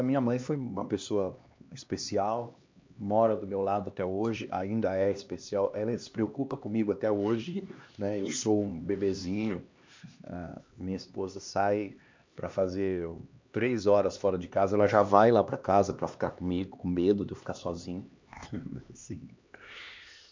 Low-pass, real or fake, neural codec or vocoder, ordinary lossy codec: 7.2 kHz; fake; codec, 16 kHz, 2 kbps, X-Codec, HuBERT features, trained on LibriSpeech; MP3, 64 kbps